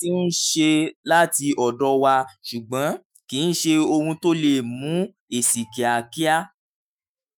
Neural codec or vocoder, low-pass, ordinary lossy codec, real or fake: autoencoder, 48 kHz, 128 numbers a frame, DAC-VAE, trained on Japanese speech; none; none; fake